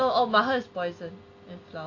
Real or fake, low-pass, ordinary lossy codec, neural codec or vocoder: real; 7.2 kHz; AAC, 48 kbps; none